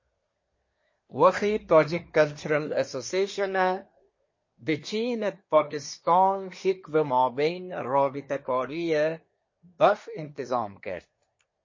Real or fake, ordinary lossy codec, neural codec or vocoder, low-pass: fake; MP3, 32 kbps; codec, 24 kHz, 1 kbps, SNAC; 7.2 kHz